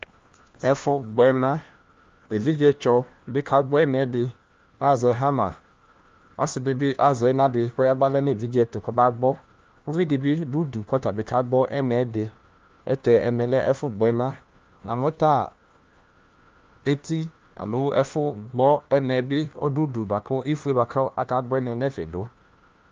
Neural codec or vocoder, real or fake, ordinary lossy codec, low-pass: codec, 16 kHz, 1 kbps, FunCodec, trained on LibriTTS, 50 frames a second; fake; Opus, 32 kbps; 7.2 kHz